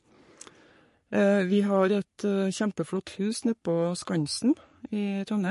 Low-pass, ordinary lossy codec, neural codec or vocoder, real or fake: 14.4 kHz; MP3, 48 kbps; codec, 44.1 kHz, 3.4 kbps, Pupu-Codec; fake